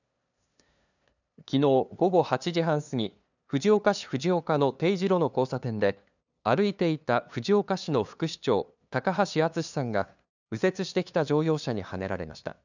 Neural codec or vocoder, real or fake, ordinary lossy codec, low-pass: codec, 16 kHz, 2 kbps, FunCodec, trained on LibriTTS, 25 frames a second; fake; none; 7.2 kHz